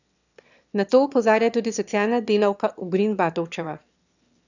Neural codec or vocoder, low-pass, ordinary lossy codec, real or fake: autoencoder, 22.05 kHz, a latent of 192 numbers a frame, VITS, trained on one speaker; 7.2 kHz; none; fake